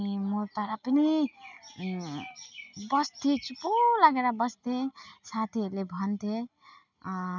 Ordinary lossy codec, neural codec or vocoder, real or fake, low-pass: none; none; real; 7.2 kHz